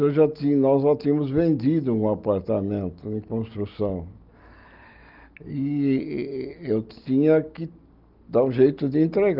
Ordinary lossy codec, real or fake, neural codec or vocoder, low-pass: Opus, 24 kbps; real; none; 5.4 kHz